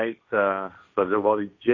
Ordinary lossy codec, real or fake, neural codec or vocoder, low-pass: AAC, 48 kbps; fake; codec, 16 kHz, 1.1 kbps, Voila-Tokenizer; 7.2 kHz